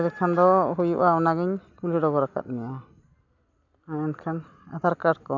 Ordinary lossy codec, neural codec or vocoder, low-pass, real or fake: none; none; 7.2 kHz; real